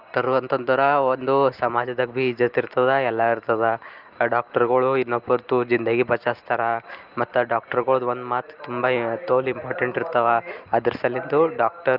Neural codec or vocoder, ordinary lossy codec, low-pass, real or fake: none; Opus, 24 kbps; 5.4 kHz; real